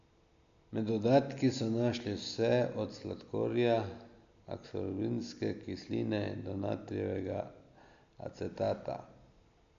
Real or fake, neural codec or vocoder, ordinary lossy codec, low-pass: real; none; MP3, 64 kbps; 7.2 kHz